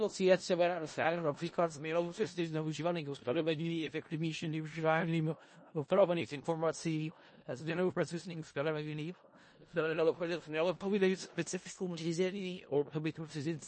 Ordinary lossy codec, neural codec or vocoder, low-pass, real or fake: MP3, 32 kbps; codec, 16 kHz in and 24 kHz out, 0.4 kbps, LongCat-Audio-Codec, four codebook decoder; 9.9 kHz; fake